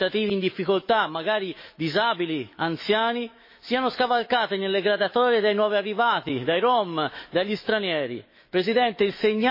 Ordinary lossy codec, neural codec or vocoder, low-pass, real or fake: MP3, 24 kbps; autoencoder, 48 kHz, 128 numbers a frame, DAC-VAE, trained on Japanese speech; 5.4 kHz; fake